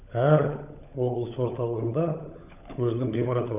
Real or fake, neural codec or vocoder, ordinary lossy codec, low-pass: fake; codec, 16 kHz, 16 kbps, FunCodec, trained on LibriTTS, 50 frames a second; none; 3.6 kHz